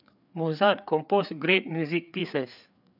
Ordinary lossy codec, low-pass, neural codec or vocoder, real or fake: none; 5.4 kHz; codec, 16 kHz, 4 kbps, FreqCodec, larger model; fake